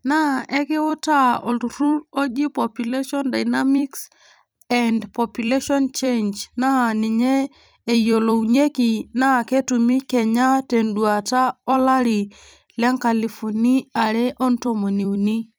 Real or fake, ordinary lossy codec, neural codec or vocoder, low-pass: fake; none; vocoder, 44.1 kHz, 128 mel bands every 256 samples, BigVGAN v2; none